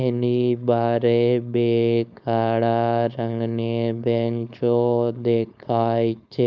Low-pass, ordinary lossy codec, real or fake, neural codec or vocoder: none; none; fake; codec, 16 kHz, 4.8 kbps, FACodec